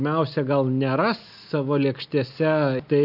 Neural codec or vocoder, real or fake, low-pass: none; real; 5.4 kHz